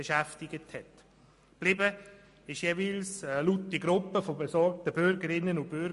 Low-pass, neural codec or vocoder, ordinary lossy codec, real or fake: 14.4 kHz; none; MP3, 48 kbps; real